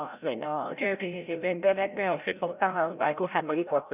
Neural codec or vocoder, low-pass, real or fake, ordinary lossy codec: codec, 16 kHz, 0.5 kbps, FreqCodec, larger model; 3.6 kHz; fake; none